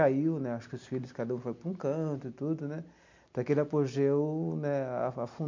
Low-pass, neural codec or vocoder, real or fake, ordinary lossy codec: 7.2 kHz; none; real; MP3, 48 kbps